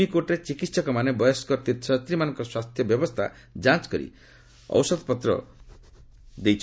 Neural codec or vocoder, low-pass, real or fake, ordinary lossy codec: none; none; real; none